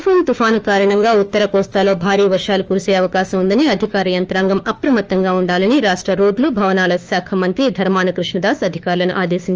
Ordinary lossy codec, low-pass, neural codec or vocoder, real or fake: Opus, 24 kbps; 7.2 kHz; autoencoder, 48 kHz, 32 numbers a frame, DAC-VAE, trained on Japanese speech; fake